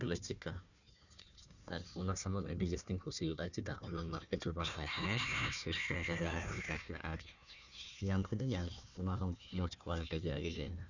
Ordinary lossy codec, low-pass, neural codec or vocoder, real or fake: none; 7.2 kHz; codec, 16 kHz, 1 kbps, FunCodec, trained on Chinese and English, 50 frames a second; fake